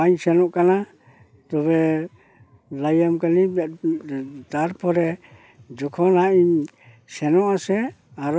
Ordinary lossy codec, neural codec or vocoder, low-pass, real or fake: none; none; none; real